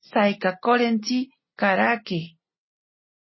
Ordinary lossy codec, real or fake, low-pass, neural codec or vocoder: MP3, 24 kbps; real; 7.2 kHz; none